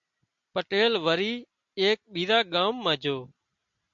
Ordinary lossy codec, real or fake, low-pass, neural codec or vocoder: MP3, 64 kbps; real; 7.2 kHz; none